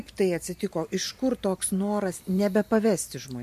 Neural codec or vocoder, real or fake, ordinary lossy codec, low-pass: vocoder, 44.1 kHz, 128 mel bands every 512 samples, BigVGAN v2; fake; MP3, 64 kbps; 14.4 kHz